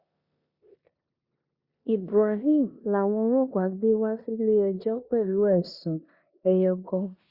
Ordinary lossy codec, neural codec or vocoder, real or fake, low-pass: Opus, 64 kbps; codec, 16 kHz in and 24 kHz out, 0.9 kbps, LongCat-Audio-Codec, four codebook decoder; fake; 5.4 kHz